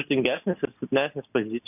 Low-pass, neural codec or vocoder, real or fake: 3.6 kHz; none; real